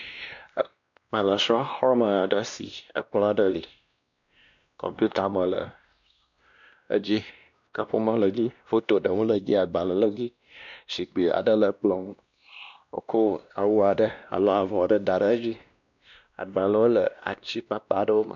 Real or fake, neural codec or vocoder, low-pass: fake; codec, 16 kHz, 1 kbps, X-Codec, WavLM features, trained on Multilingual LibriSpeech; 7.2 kHz